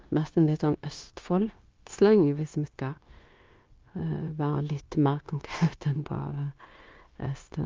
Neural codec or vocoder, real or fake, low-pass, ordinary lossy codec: codec, 16 kHz, 0.9 kbps, LongCat-Audio-Codec; fake; 7.2 kHz; Opus, 24 kbps